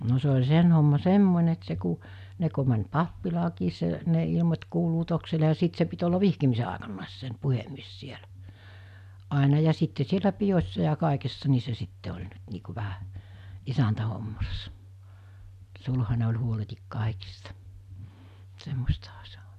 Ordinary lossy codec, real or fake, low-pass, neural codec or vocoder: none; real; 14.4 kHz; none